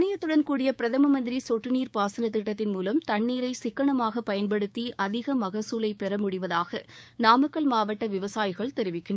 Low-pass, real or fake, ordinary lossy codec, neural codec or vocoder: none; fake; none; codec, 16 kHz, 6 kbps, DAC